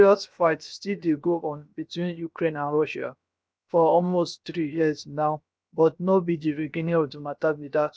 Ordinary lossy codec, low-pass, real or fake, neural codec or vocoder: none; none; fake; codec, 16 kHz, 0.7 kbps, FocalCodec